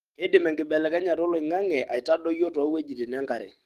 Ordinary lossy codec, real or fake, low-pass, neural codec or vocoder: Opus, 24 kbps; fake; 14.4 kHz; codec, 44.1 kHz, 7.8 kbps, DAC